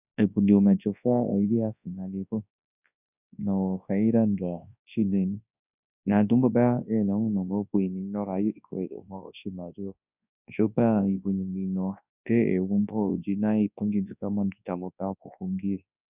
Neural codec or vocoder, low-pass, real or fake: codec, 24 kHz, 0.9 kbps, WavTokenizer, large speech release; 3.6 kHz; fake